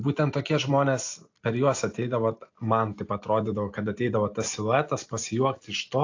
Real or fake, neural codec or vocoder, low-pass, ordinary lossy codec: real; none; 7.2 kHz; AAC, 48 kbps